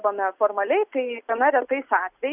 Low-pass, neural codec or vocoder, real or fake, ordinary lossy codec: 3.6 kHz; none; real; Opus, 64 kbps